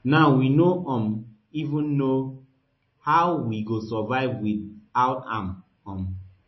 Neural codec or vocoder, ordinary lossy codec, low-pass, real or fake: none; MP3, 24 kbps; 7.2 kHz; real